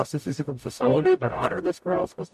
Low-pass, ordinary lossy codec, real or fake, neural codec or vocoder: 14.4 kHz; MP3, 64 kbps; fake; codec, 44.1 kHz, 0.9 kbps, DAC